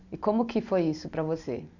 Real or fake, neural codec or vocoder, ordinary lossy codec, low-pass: real; none; none; 7.2 kHz